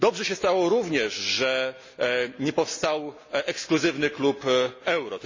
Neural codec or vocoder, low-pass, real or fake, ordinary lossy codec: none; 7.2 kHz; real; MP3, 32 kbps